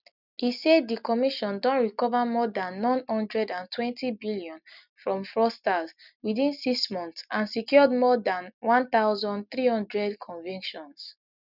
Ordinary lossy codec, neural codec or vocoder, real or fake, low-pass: none; none; real; 5.4 kHz